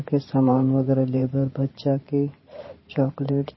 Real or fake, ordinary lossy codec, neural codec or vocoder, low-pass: fake; MP3, 24 kbps; vocoder, 44.1 kHz, 128 mel bands every 512 samples, BigVGAN v2; 7.2 kHz